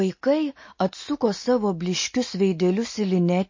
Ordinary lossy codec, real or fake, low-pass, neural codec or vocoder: MP3, 32 kbps; real; 7.2 kHz; none